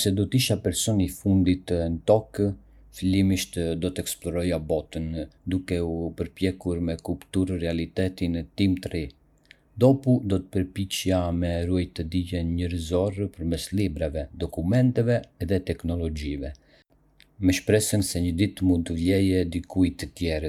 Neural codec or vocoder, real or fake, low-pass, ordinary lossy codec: none; real; 19.8 kHz; none